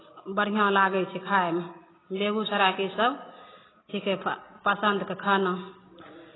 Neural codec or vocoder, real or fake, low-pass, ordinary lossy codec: none; real; 7.2 kHz; AAC, 16 kbps